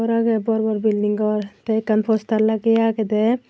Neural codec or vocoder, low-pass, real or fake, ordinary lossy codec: none; none; real; none